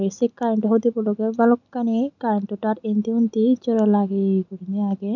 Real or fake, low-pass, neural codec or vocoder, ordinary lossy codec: real; 7.2 kHz; none; none